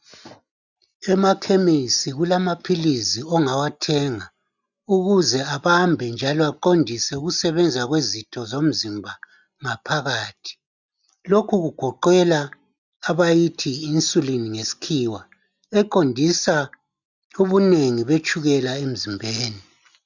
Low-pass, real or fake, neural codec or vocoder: 7.2 kHz; real; none